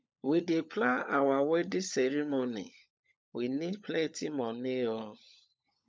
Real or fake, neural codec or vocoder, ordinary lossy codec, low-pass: fake; codec, 16 kHz, 16 kbps, FunCodec, trained on LibriTTS, 50 frames a second; none; none